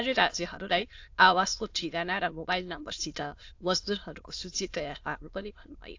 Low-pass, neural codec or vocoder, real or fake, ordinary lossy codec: 7.2 kHz; autoencoder, 22.05 kHz, a latent of 192 numbers a frame, VITS, trained on many speakers; fake; AAC, 48 kbps